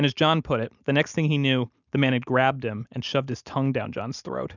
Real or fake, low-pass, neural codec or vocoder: real; 7.2 kHz; none